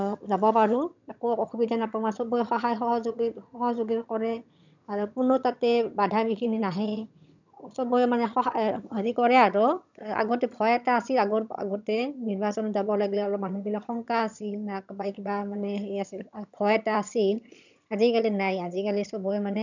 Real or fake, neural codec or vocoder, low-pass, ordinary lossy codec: fake; vocoder, 22.05 kHz, 80 mel bands, HiFi-GAN; 7.2 kHz; none